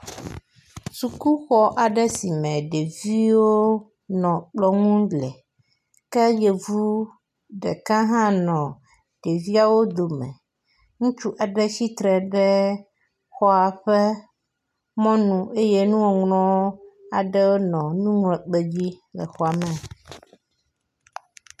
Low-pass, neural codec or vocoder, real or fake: 14.4 kHz; none; real